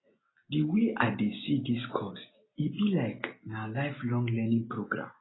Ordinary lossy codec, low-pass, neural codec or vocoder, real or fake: AAC, 16 kbps; 7.2 kHz; none; real